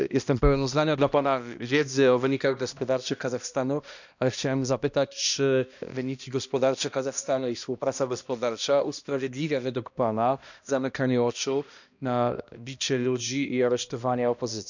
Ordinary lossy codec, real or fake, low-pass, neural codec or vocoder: none; fake; 7.2 kHz; codec, 16 kHz, 1 kbps, X-Codec, HuBERT features, trained on balanced general audio